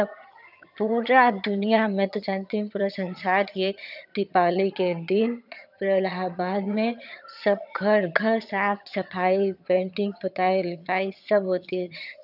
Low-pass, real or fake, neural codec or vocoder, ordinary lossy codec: 5.4 kHz; fake; vocoder, 22.05 kHz, 80 mel bands, HiFi-GAN; none